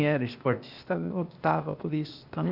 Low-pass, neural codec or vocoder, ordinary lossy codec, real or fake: 5.4 kHz; codec, 16 kHz, 0.8 kbps, ZipCodec; none; fake